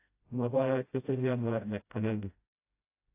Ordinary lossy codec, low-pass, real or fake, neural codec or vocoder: AAC, 24 kbps; 3.6 kHz; fake; codec, 16 kHz, 0.5 kbps, FreqCodec, smaller model